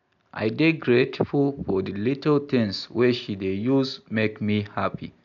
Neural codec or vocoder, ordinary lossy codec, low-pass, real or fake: none; none; 7.2 kHz; real